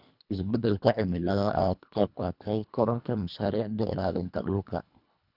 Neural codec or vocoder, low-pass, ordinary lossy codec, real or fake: codec, 24 kHz, 1.5 kbps, HILCodec; 5.4 kHz; none; fake